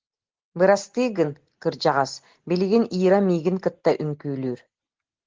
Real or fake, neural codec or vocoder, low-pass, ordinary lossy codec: real; none; 7.2 kHz; Opus, 16 kbps